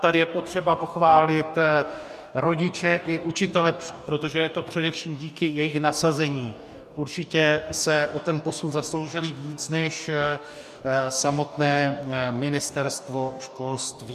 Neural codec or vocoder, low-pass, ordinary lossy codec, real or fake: codec, 44.1 kHz, 2.6 kbps, DAC; 14.4 kHz; MP3, 96 kbps; fake